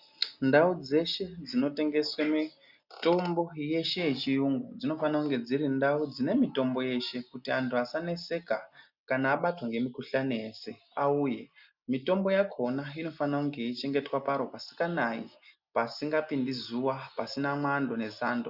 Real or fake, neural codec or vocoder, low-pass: real; none; 5.4 kHz